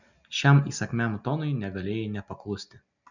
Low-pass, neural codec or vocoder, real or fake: 7.2 kHz; none; real